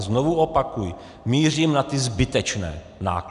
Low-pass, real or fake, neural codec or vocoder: 10.8 kHz; real; none